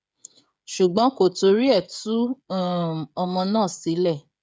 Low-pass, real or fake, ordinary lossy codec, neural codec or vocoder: none; fake; none; codec, 16 kHz, 16 kbps, FreqCodec, smaller model